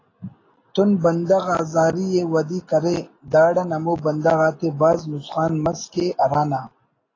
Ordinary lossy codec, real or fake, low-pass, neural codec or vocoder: AAC, 32 kbps; real; 7.2 kHz; none